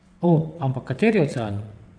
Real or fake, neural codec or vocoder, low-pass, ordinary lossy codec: fake; vocoder, 22.05 kHz, 80 mel bands, WaveNeXt; 9.9 kHz; none